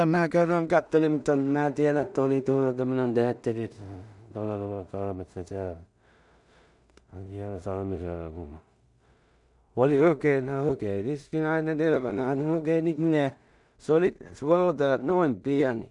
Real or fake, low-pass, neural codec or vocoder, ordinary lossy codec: fake; 10.8 kHz; codec, 16 kHz in and 24 kHz out, 0.4 kbps, LongCat-Audio-Codec, two codebook decoder; none